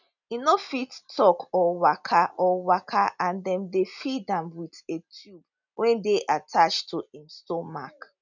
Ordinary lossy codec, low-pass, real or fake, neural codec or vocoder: none; 7.2 kHz; real; none